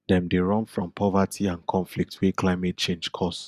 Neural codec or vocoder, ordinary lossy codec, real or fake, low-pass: none; Opus, 64 kbps; real; 14.4 kHz